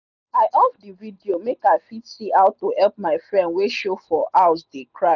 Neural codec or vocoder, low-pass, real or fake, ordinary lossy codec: none; 7.2 kHz; real; none